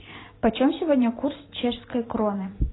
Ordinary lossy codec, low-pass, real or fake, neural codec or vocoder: AAC, 16 kbps; 7.2 kHz; fake; vocoder, 44.1 kHz, 128 mel bands every 512 samples, BigVGAN v2